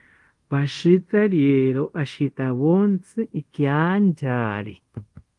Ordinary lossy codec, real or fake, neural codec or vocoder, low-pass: Opus, 24 kbps; fake; codec, 24 kHz, 0.5 kbps, DualCodec; 10.8 kHz